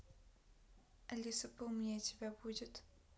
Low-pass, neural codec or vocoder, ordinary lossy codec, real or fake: none; none; none; real